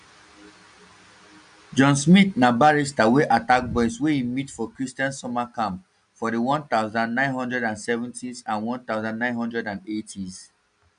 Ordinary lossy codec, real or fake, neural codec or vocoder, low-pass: none; real; none; 9.9 kHz